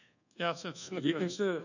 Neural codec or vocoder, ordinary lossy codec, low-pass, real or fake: codec, 24 kHz, 1.2 kbps, DualCodec; none; 7.2 kHz; fake